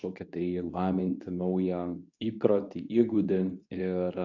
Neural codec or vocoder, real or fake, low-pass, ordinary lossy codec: codec, 24 kHz, 0.9 kbps, WavTokenizer, medium speech release version 2; fake; 7.2 kHz; AAC, 48 kbps